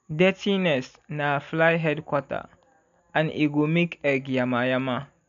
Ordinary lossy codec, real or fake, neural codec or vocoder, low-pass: none; real; none; 7.2 kHz